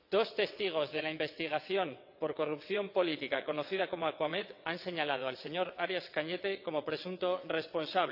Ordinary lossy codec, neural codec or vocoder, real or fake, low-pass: none; vocoder, 22.05 kHz, 80 mel bands, WaveNeXt; fake; 5.4 kHz